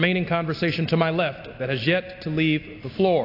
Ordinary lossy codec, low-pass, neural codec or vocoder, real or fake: AAC, 32 kbps; 5.4 kHz; none; real